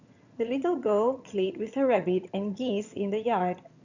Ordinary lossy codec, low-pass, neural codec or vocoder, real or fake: none; 7.2 kHz; vocoder, 22.05 kHz, 80 mel bands, HiFi-GAN; fake